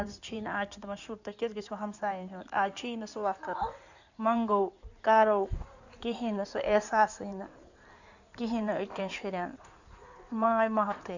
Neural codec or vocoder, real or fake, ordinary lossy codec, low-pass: codec, 16 kHz in and 24 kHz out, 2.2 kbps, FireRedTTS-2 codec; fake; none; 7.2 kHz